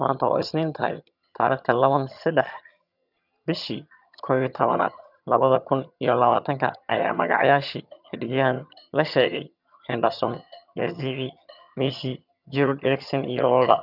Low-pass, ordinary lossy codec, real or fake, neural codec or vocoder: 5.4 kHz; none; fake; vocoder, 22.05 kHz, 80 mel bands, HiFi-GAN